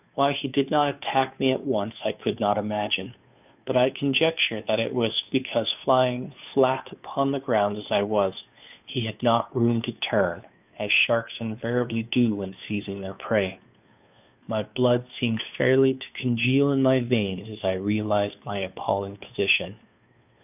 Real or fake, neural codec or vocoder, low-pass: fake; codec, 16 kHz, 2 kbps, FunCodec, trained on Chinese and English, 25 frames a second; 3.6 kHz